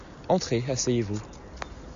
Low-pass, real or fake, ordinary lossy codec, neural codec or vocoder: 7.2 kHz; real; AAC, 64 kbps; none